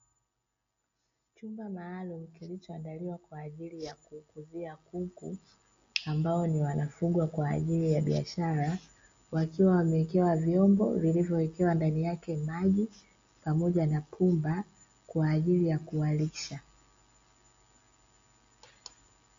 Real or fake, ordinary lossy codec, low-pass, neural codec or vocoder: real; MP3, 48 kbps; 7.2 kHz; none